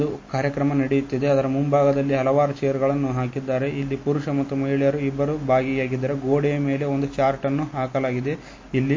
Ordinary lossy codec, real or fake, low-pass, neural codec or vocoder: MP3, 32 kbps; real; 7.2 kHz; none